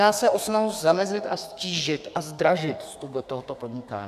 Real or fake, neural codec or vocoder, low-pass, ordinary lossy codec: fake; codec, 32 kHz, 1.9 kbps, SNAC; 14.4 kHz; AAC, 96 kbps